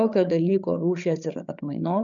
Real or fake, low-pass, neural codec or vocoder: fake; 7.2 kHz; codec, 16 kHz, 8 kbps, FreqCodec, larger model